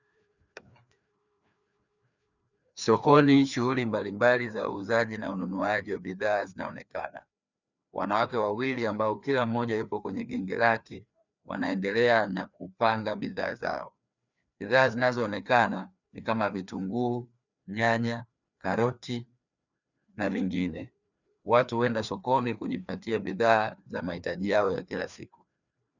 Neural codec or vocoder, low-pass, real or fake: codec, 16 kHz, 2 kbps, FreqCodec, larger model; 7.2 kHz; fake